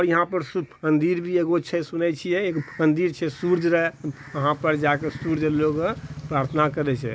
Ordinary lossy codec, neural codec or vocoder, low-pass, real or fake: none; none; none; real